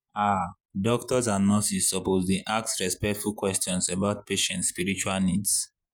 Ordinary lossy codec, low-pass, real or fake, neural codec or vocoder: none; none; fake; vocoder, 48 kHz, 128 mel bands, Vocos